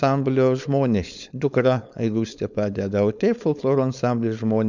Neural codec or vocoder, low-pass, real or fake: codec, 16 kHz, 4.8 kbps, FACodec; 7.2 kHz; fake